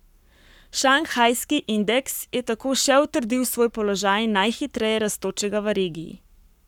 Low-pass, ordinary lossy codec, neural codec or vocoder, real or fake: 19.8 kHz; none; codec, 44.1 kHz, 7.8 kbps, Pupu-Codec; fake